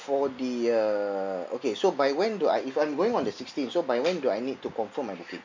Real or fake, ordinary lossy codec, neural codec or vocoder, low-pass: real; MP3, 48 kbps; none; 7.2 kHz